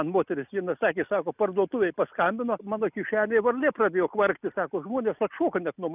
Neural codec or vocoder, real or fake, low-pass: none; real; 3.6 kHz